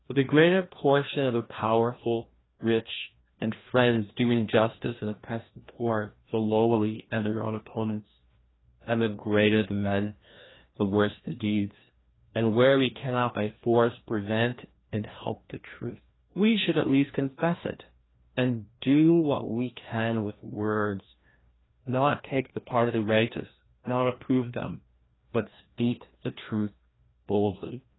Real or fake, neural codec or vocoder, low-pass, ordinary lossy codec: fake; codec, 16 kHz, 1 kbps, FreqCodec, larger model; 7.2 kHz; AAC, 16 kbps